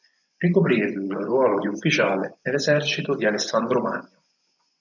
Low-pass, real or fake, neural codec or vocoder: 7.2 kHz; fake; vocoder, 24 kHz, 100 mel bands, Vocos